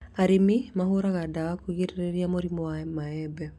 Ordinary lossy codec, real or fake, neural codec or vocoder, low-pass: none; real; none; none